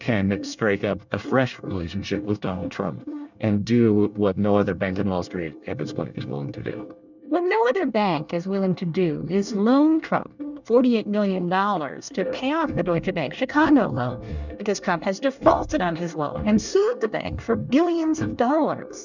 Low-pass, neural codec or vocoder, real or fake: 7.2 kHz; codec, 24 kHz, 1 kbps, SNAC; fake